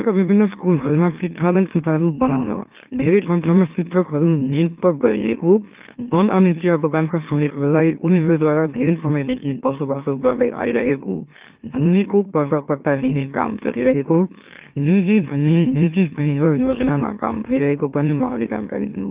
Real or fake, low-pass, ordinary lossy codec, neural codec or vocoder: fake; 3.6 kHz; Opus, 24 kbps; autoencoder, 44.1 kHz, a latent of 192 numbers a frame, MeloTTS